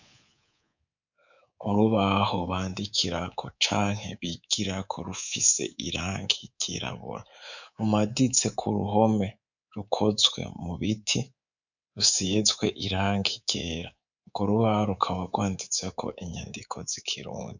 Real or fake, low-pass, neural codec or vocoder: fake; 7.2 kHz; codec, 24 kHz, 3.1 kbps, DualCodec